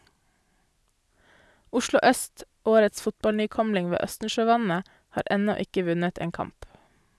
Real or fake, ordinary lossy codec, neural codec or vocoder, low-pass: real; none; none; none